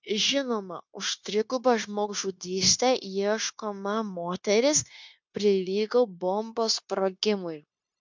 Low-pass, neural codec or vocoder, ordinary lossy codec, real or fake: 7.2 kHz; codec, 16 kHz, 0.9 kbps, LongCat-Audio-Codec; MP3, 64 kbps; fake